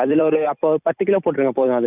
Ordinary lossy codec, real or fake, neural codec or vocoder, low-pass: none; fake; vocoder, 44.1 kHz, 128 mel bands every 256 samples, BigVGAN v2; 3.6 kHz